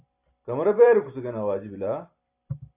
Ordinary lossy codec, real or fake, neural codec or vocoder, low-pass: AAC, 32 kbps; fake; vocoder, 44.1 kHz, 128 mel bands every 256 samples, BigVGAN v2; 3.6 kHz